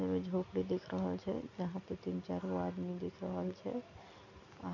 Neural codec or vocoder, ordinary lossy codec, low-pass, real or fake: none; none; 7.2 kHz; real